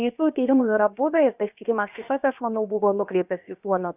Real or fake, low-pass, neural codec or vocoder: fake; 3.6 kHz; codec, 16 kHz, about 1 kbps, DyCAST, with the encoder's durations